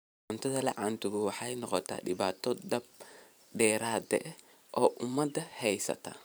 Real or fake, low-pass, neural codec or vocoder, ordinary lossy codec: fake; none; vocoder, 44.1 kHz, 128 mel bands every 512 samples, BigVGAN v2; none